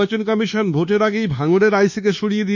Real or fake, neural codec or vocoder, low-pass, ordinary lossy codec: fake; codec, 24 kHz, 1.2 kbps, DualCodec; 7.2 kHz; none